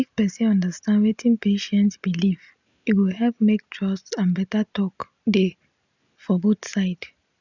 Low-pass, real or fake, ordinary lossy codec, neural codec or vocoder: 7.2 kHz; real; none; none